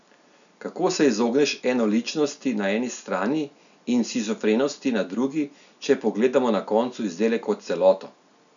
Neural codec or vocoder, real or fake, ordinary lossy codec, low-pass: none; real; MP3, 96 kbps; 7.2 kHz